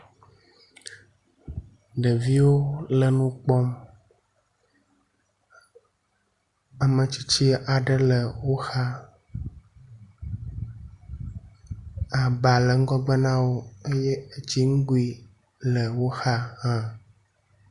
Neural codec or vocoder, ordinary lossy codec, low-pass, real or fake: none; Opus, 64 kbps; 10.8 kHz; real